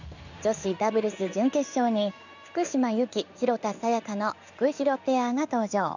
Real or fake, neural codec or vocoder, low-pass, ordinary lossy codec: fake; codec, 16 kHz in and 24 kHz out, 1 kbps, XY-Tokenizer; 7.2 kHz; none